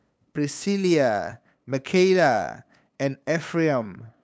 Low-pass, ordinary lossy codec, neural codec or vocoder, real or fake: none; none; codec, 16 kHz, 8 kbps, FunCodec, trained on LibriTTS, 25 frames a second; fake